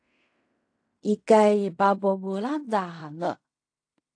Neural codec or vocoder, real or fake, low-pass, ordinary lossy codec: codec, 16 kHz in and 24 kHz out, 0.4 kbps, LongCat-Audio-Codec, fine tuned four codebook decoder; fake; 9.9 kHz; AAC, 48 kbps